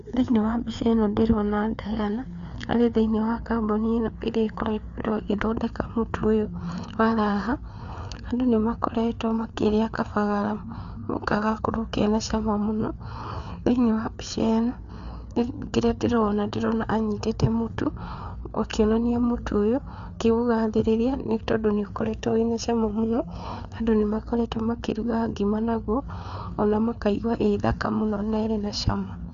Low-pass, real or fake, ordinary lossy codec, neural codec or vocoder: 7.2 kHz; fake; none; codec, 16 kHz, 4 kbps, FreqCodec, larger model